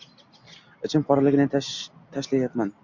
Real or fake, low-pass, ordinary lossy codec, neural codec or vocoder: real; 7.2 kHz; MP3, 64 kbps; none